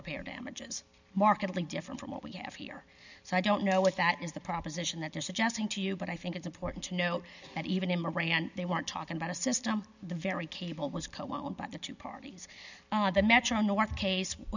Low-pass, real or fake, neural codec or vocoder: 7.2 kHz; real; none